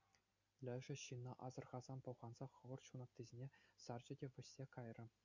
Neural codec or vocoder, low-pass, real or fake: none; 7.2 kHz; real